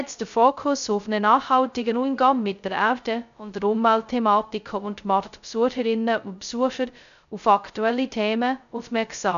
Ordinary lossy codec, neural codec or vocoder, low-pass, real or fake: none; codec, 16 kHz, 0.2 kbps, FocalCodec; 7.2 kHz; fake